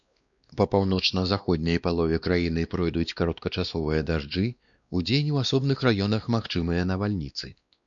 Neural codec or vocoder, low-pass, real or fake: codec, 16 kHz, 2 kbps, X-Codec, WavLM features, trained on Multilingual LibriSpeech; 7.2 kHz; fake